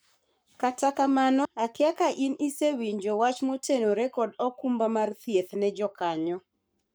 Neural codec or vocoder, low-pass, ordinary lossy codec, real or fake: codec, 44.1 kHz, 7.8 kbps, Pupu-Codec; none; none; fake